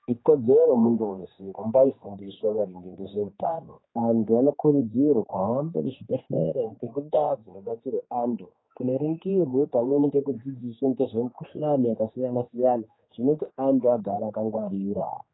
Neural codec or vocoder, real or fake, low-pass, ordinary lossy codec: codec, 16 kHz, 2 kbps, X-Codec, HuBERT features, trained on general audio; fake; 7.2 kHz; AAC, 16 kbps